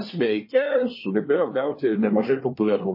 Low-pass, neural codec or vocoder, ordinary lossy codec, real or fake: 5.4 kHz; codec, 24 kHz, 1 kbps, SNAC; MP3, 24 kbps; fake